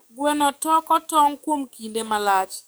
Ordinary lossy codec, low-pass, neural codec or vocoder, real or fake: none; none; codec, 44.1 kHz, 7.8 kbps, DAC; fake